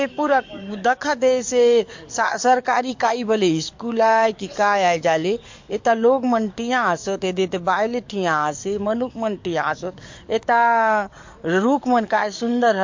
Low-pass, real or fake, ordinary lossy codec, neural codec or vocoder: 7.2 kHz; fake; MP3, 48 kbps; codec, 44.1 kHz, 7.8 kbps, DAC